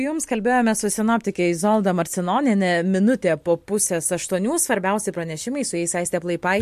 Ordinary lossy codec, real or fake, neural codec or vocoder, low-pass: MP3, 64 kbps; real; none; 14.4 kHz